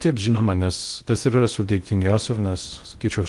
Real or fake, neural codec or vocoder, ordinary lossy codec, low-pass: fake; codec, 16 kHz in and 24 kHz out, 0.6 kbps, FocalCodec, streaming, 2048 codes; Opus, 24 kbps; 10.8 kHz